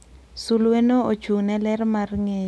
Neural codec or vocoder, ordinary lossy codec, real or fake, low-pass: none; none; real; none